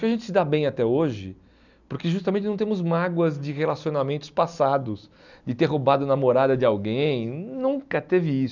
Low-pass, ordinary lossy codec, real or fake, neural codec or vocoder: 7.2 kHz; none; real; none